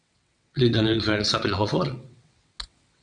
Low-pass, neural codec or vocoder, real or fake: 9.9 kHz; vocoder, 22.05 kHz, 80 mel bands, WaveNeXt; fake